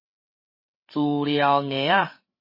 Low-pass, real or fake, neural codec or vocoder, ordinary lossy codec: 5.4 kHz; fake; codec, 16 kHz, 16 kbps, FreqCodec, larger model; MP3, 24 kbps